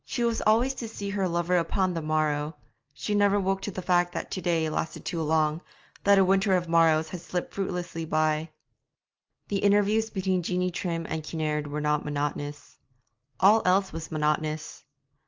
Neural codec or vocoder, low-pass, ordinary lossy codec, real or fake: none; 7.2 kHz; Opus, 24 kbps; real